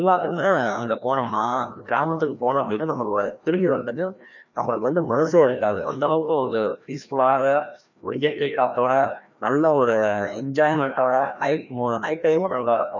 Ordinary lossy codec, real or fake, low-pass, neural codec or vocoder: none; fake; 7.2 kHz; codec, 16 kHz, 1 kbps, FreqCodec, larger model